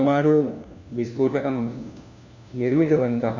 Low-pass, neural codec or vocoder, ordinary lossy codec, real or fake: 7.2 kHz; codec, 16 kHz, 1 kbps, FunCodec, trained on LibriTTS, 50 frames a second; none; fake